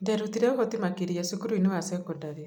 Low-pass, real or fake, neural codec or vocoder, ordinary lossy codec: none; real; none; none